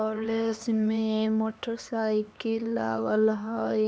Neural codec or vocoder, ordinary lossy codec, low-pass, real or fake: codec, 16 kHz, 2 kbps, X-Codec, HuBERT features, trained on LibriSpeech; none; none; fake